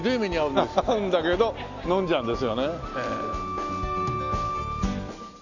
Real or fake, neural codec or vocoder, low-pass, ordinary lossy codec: real; none; 7.2 kHz; none